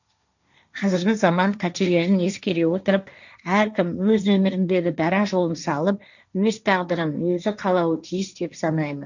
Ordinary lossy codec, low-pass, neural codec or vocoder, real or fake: none; 7.2 kHz; codec, 16 kHz, 1.1 kbps, Voila-Tokenizer; fake